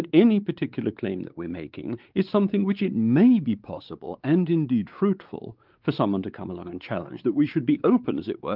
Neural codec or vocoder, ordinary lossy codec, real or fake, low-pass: codec, 16 kHz, 4 kbps, X-Codec, WavLM features, trained on Multilingual LibriSpeech; Opus, 32 kbps; fake; 5.4 kHz